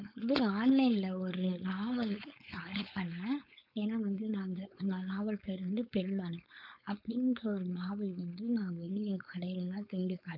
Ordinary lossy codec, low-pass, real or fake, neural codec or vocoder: none; 5.4 kHz; fake; codec, 16 kHz, 4.8 kbps, FACodec